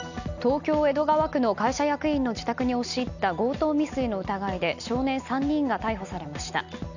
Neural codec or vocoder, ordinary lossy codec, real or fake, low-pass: none; none; real; 7.2 kHz